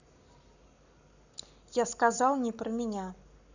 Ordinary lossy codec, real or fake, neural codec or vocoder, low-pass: none; fake; codec, 44.1 kHz, 7.8 kbps, Pupu-Codec; 7.2 kHz